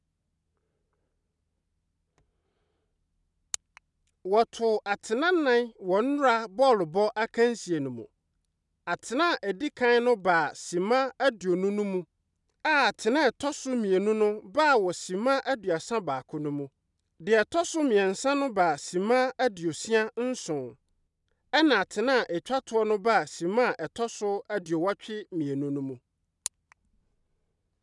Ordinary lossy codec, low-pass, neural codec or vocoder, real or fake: none; 10.8 kHz; none; real